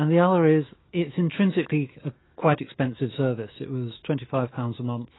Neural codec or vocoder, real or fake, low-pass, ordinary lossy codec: autoencoder, 48 kHz, 128 numbers a frame, DAC-VAE, trained on Japanese speech; fake; 7.2 kHz; AAC, 16 kbps